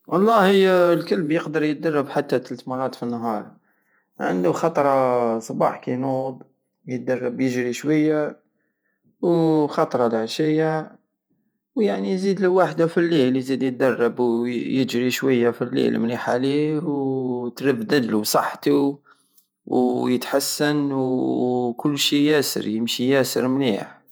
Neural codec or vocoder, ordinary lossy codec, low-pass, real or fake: vocoder, 48 kHz, 128 mel bands, Vocos; none; none; fake